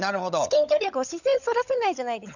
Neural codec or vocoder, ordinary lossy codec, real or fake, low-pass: codec, 16 kHz, 16 kbps, FunCodec, trained on LibriTTS, 50 frames a second; none; fake; 7.2 kHz